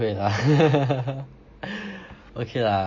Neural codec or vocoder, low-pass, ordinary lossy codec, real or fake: none; 7.2 kHz; MP3, 32 kbps; real